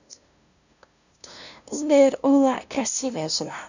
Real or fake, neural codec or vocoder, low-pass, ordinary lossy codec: fake; codec, 16 kHz, 0.5 kbps, FunCodec, trained on LibriTTS, 25 frames a second; 7.2 kHz; none